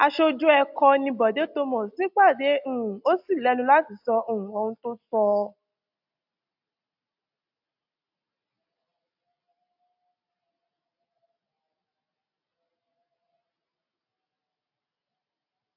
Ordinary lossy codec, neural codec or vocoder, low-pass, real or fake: AAC, 48 kbps; none; 5.4 kHz; real